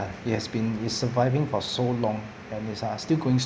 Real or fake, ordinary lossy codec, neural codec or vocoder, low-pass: real; none; none; none